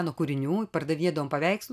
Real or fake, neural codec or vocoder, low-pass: real; none; 14.4 kHz